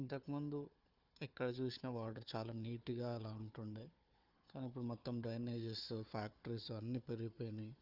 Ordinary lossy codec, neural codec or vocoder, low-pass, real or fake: Opus, 32 kbps; codec, 16 kHz, 4 kbps, FunCodec, trained on Chinese and English, 50 frames a second; 5.4 kHz; fake